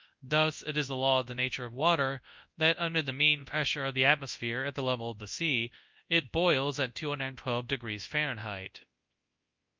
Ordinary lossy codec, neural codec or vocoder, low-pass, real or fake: Opus, 24 kbps; codec, 24 kHz, 0.9 kbps, WavTokenizer, large speech release; 7.2 kHz; fake